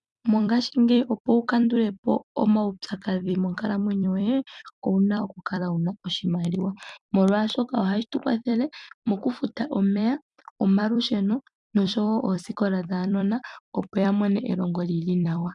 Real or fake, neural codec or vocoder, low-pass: fake; vocoder, 44.1 kHz, 128 mel bands every 256 samples, BigVGAN v2; 10.8 kHz